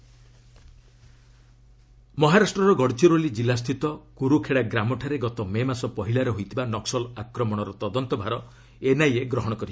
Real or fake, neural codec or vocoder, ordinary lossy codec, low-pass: real; none; none; none